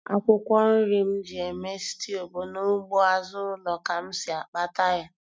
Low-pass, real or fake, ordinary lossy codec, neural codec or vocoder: none; real; none; none